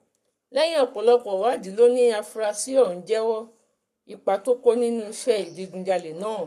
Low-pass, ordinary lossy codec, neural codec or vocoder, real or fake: 14.4 kHz; none; codec, 44.1 kHz, 7.8 kbps, Pupu-Codec; fake